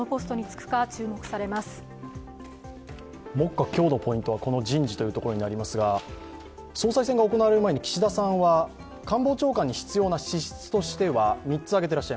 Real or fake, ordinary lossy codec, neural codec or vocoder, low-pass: real; none; none; none